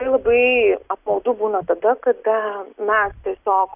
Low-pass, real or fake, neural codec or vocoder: 3.6 kHz; real; none